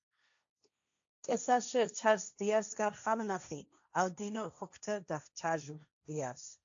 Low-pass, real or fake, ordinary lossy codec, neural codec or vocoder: 7.2 kHz; fake; AAC, 64 kbps; codec, 16 kHz, 1.1 kbps, Voila-Tokenizer